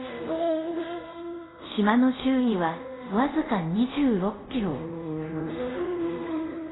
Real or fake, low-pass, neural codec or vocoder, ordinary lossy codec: fake; 7.2 kHz; codec, 24 kHz, 0.5 kbps, DualCodec; AAC, 16 kbps